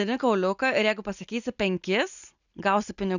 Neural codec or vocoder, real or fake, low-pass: none; real; 7.2 kHz